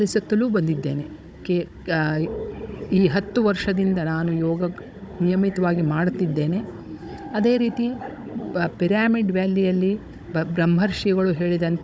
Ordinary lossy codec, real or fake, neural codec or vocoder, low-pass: none; fake; codec, 16 kHz, 16 kbps, FunCodec, trained on Chinese and English, 50 frames a second; none